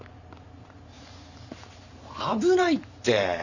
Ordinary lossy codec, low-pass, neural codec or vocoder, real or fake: none; 7.2 kHz; vocoder, 44.1 kHz, 128 mel bands every 512 samples, BigVGAN v2; fake